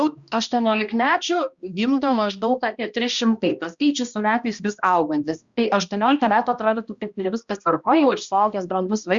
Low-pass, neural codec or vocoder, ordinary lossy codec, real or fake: 7.2 kHz; codec, 16 kHz, 1 kbps, X-Codec, HuBERT features, trained on balanced general audio; Opus, 64 kbps; fake